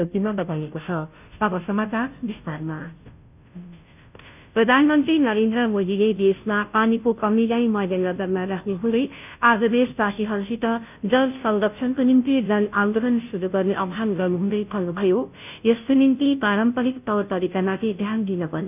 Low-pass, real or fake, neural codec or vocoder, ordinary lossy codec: 3.6 kHz; fake; codec, 16 kHz, 0.5 kbps, FunCodec, trained on Chinese and English, 25 frames a second; none